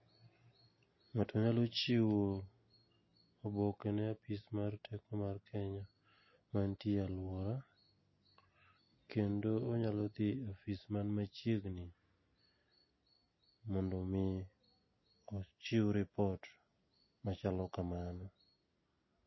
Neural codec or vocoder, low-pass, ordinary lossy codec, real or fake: none; 7.2 kHz; MP3, 24 kbps; real